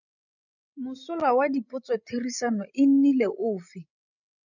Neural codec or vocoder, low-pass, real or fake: codec, 16 kHz, 16 kbps, FreqCodec, larger model; 7.2 kHz; fake